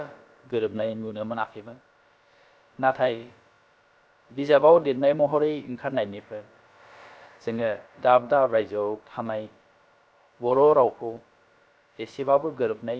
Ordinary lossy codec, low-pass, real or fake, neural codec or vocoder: none; none; fake; codec, 16 kHz, about 1 kbps, DyCAST, with the encoder's durations